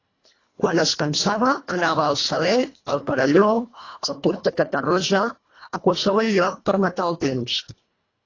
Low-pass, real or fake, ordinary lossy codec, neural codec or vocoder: 7.2 kHz; fake; AAC, 32 kbps; codec, 24 kHz, 1.5 kbps, HILCodec